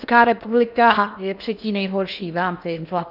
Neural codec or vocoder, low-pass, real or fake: codec, 16 kHz in and 24 kHz out, 0.8 kbps, FocalCodec, streaming, 65536 codes; 5.4 kHz; fake